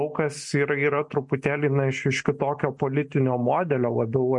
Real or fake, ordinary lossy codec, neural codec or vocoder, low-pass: real; MP3, 48 kbps; none; 10.8 kHz